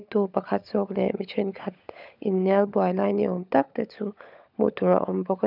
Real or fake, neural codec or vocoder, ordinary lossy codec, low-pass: fake; codec, 16 kHz, 16 kbps, FunCodec, trained on LibriTTS, 50 frames a second; none; 5.4 kHz